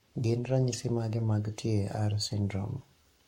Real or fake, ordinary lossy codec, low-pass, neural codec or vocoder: fake; MP3, 64 kbps; 19.8 kHz; codec, 44.1 kHz, 7.8 kbps, Pupu-Codec